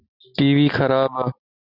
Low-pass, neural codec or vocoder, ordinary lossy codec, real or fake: 5.4 kHz; none; Opus, 64 kbps; real